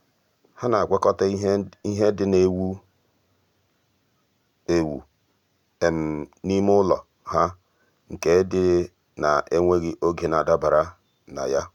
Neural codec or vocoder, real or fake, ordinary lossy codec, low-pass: none; real; none; 19.8 kHz